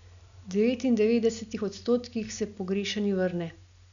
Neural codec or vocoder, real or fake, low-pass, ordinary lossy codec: none; real; 7.2 kHz; none